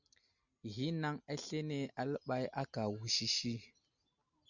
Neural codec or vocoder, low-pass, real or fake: none; 7.2 kHz; real